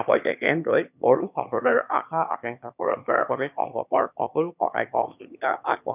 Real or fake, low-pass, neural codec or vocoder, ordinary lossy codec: fake; 3.6 kHz; autoencoder, 22.05 kHz, a latent of 192 numbers a frame, VITS, trained on one speaker; none